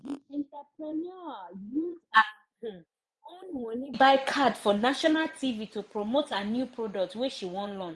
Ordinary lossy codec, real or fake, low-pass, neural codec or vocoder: none; fake; none; vocoder, 24 kHz, 100 mel bands, Vocos